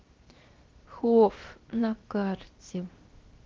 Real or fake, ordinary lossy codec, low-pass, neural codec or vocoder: fake; Opus, 16 kbps; 7.2 kHz; codec, 16 kHz, 0.7 kbps, FocalCodec